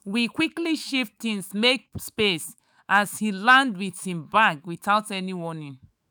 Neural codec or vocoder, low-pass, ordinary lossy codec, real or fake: autoencoder, 48 kHz, 128 numbers a frame, DAC-VAE, trained on Japanese speech; none; none; fake